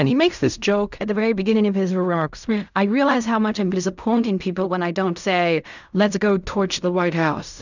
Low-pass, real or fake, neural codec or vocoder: 7.2 kHz; fake; codec, 16 kHz in and 24 kHz out, 0.4 kbps, LongCat-Audio-Codec, fine tuned four codebook decoder